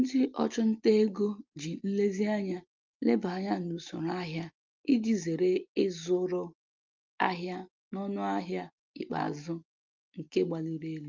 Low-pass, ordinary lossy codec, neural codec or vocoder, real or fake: 7.2 kHz; Opus, 24 kbps; none; real